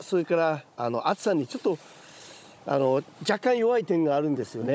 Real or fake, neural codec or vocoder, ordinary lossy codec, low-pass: fake; codec, 16 kHz, 16 kbps, FunCodec, trained on Chinese and English, 50 frames a second; none; none